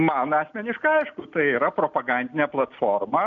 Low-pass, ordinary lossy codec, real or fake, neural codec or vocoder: 7.2 kHz; MP3, 64 kbps; real; none